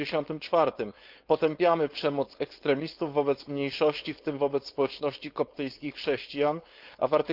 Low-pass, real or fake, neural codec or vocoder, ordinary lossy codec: 5.4 kHz; fake; codec, 16 kHz, 4.8 kbps, FACodec; Opus, 32 kbps